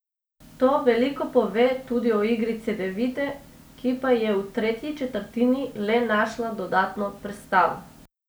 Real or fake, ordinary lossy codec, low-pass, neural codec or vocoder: real; none; none; none